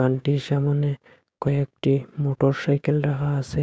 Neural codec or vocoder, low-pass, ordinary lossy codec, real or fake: codec, 16 kHz, 6 kbps, DAC; none; none; fake